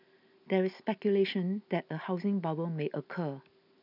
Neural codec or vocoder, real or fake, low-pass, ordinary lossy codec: none; real; 5.4 kHz; none